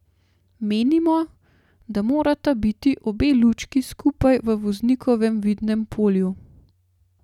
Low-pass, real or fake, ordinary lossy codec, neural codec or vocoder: 19.8 kHz; real; none; none